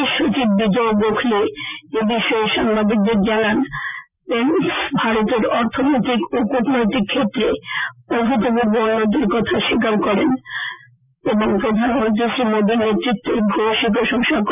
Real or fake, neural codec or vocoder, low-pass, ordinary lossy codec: real; none; 3.6 kHz; none